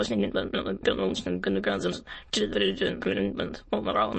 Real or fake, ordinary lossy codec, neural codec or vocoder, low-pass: fake; MP3, 32 kbps; autoencoder, 22.05 kHz, a latent of 192 numbers a frame, VITS, trained on many speakers; 9.9 kHz